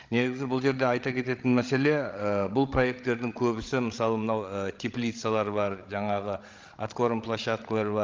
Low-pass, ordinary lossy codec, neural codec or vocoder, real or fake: 7.2 kHz; Opus, 32 kbps; codec, 16 kHz, 16 kbps, FreqCodec, larger model; fake